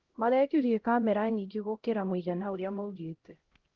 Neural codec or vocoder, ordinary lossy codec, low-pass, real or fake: codec, 16 kHz, 0.5 kbps, X-Codec, HuBERT features, trained on LibriSpeech; Opus, 16 kbps; 7.2 kHz; fake